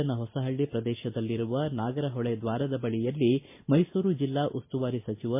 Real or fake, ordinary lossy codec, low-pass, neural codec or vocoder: real; MP3, 24 kbps; 3.6 kHz; none